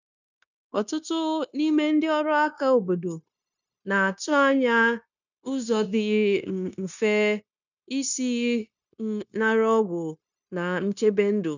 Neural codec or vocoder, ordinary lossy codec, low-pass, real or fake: codec, 16 kHz, 0.9 kbps, LongCat-Audio-Codec; none; 7.2 kHz; fake